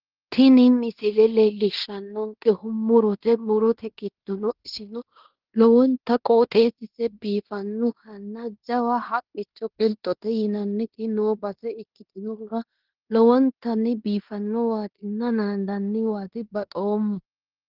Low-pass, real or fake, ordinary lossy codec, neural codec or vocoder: 5.4 kHz; fake; Opus, 16 kbps; codec, 16 kHz in and 24 kHz out, 0.9 kbps, LongCat-Audio-Codec, fine tuned four codebook decoder